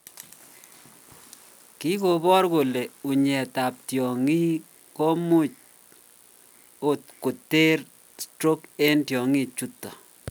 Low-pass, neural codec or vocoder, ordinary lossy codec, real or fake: none; none; none; real